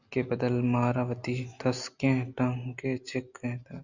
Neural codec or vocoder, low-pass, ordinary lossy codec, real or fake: none; 7.2 kHz; Opus, 64 kbps; real